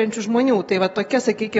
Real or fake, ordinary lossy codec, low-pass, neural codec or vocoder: real; AAC, 24 kbps; 9.9 kHz; none